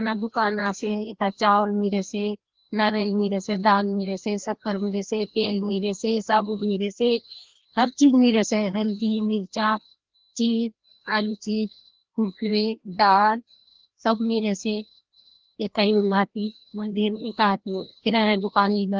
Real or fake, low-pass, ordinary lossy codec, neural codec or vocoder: fake; 7.2 kHz; Opus, 16 kbps; codec, 16 kHz, 1 kbps, FreqCodec, larger model